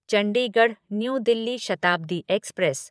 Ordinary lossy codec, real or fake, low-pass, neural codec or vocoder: none; fake; none; vocoder, 22.05 kHz, 80 mel bands, Vocos